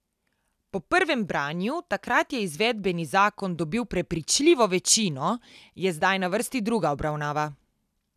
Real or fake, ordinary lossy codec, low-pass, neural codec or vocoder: real; none; 14.4 kHz; none